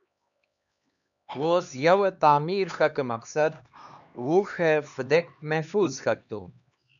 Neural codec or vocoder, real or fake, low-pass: codec, 16 kHz, 2 kbps, X-Codec, HuBERT features, trained on LibriSpeech; fake; 7.2 kHz